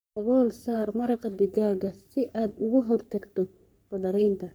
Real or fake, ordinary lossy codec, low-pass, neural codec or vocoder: fake; none; none; codec, 44.1 kHz, 3.4 kbps, Pupu-Codec